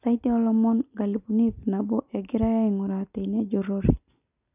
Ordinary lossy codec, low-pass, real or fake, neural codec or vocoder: none; 3.6 kHz; real; none